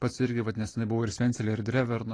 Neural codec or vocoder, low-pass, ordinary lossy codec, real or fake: none; 9.9 kHz; AAC, 32 kbps; real